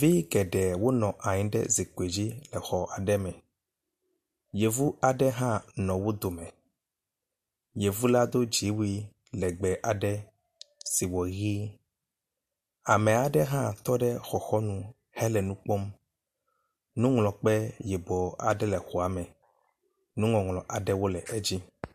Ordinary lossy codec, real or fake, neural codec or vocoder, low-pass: MP3, 64 kbps; real; none; 14.4 kHz